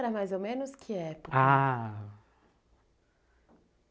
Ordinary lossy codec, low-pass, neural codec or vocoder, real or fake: none; none; none; real